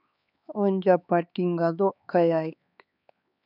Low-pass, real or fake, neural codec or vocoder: 5.4 kHz; fake; codec, 16 kHz, 4 kbps, X-Codec, HuBERT features, trained on LibriSpeech